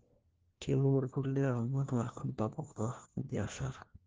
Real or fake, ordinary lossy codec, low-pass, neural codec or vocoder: fake; Opus, 16 kbps; 7.2 kHz; codec, 16 kHz, 1 kbps, FunCodec, trained on LibriTTS, 50 frames a second